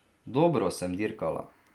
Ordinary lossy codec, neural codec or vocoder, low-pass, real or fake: Opus, 32 kbps; none; 19.8 kHz; real